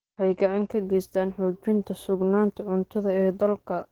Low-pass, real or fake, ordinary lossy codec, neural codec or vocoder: 19.8 kHz; fake; Opus, 16 kbps; codec, 44.1 kHz, 7.8 kbps, DAC